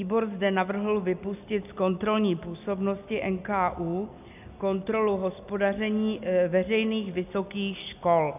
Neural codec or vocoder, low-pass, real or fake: none; 3.6 kHz; real